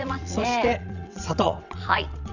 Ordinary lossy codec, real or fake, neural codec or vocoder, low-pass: none; fake; vocoder, 22.05 kHz, 80 mel bands, WaveNeXt; 7.2 kHz